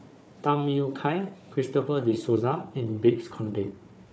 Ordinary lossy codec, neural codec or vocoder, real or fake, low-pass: none; codec, 16 kHz, 4 kbps, FunCodec, trained on Chinese and English, 50 frames a second; fake; none